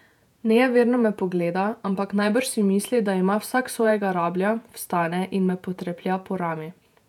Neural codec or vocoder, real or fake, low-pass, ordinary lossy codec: vocoder, 48 kHz, 128 mel bands, Vocos; fake; 19.8 kHz; none